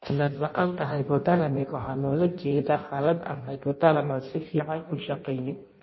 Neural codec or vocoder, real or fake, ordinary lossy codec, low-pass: codec, 16 kHz in and 24 kHz out, 0.6 kbps, FireRedTTS-2 codec; fake; MP3, 24 kbps; 7.2 kHz